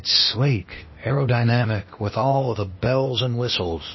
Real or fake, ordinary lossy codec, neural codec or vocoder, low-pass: fake; MP3, 24 kbps; codec, 16 kHz, 0.8 kbps, ZipCodec; 7.2 kHz